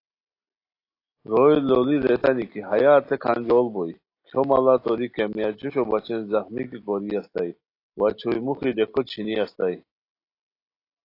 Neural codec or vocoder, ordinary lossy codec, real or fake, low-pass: none; AAC, 32 kbps; real; 5.4 kHz